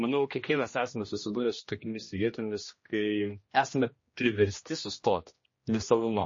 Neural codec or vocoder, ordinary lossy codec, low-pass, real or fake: codec, 16 kHz, 1 kbps, X-Codec, HuBERT features, trained on general audio; MP3, 32 kbps; 7.2 kHz; fake